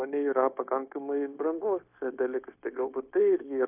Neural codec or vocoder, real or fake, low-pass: codec, 16 kHz, 0.9 kbps, LongCat-Audio-Codec; fake; 3.6 kHz